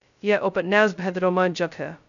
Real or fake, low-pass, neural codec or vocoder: fake; 7.2 kHz; codec, 16 kHz, 0.2 kbps, FocalCodec